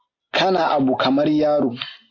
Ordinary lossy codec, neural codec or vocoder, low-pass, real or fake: AAC, 32 kbps; none; 7.2 kHz; real